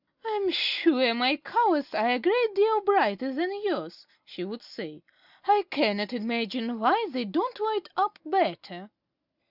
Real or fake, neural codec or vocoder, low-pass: real; none; 5.4 kHz